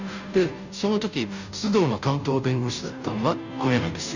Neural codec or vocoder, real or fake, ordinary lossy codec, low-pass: codec, 16 kHz, 0.5 kbps, FunCodec, trained on Chinese and English, 25 frames a second; fake; none; 7.2 kHz